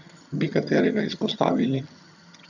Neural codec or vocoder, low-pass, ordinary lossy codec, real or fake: vocoder, 22.05 kHz, 80 mel bands, HiFi-GAN; 7.2 kHz; none; fake